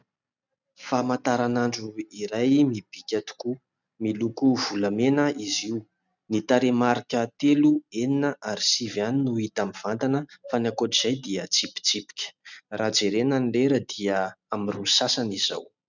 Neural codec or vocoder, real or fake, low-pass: none; real; 7.2 kHz